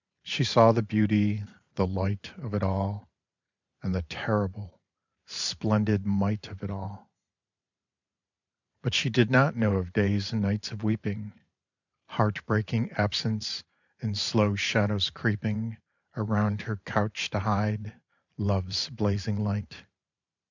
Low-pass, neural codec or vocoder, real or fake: 7.2 kHz; vocoder, 44.1 kHz, 128 mel bands every 512 samples, BigVGAN v2; fake